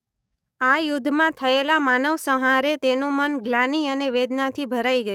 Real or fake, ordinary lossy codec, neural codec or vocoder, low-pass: fake; none; codec, 44.1 kHz, 7.8 kbps, DAC; 19.8 kHz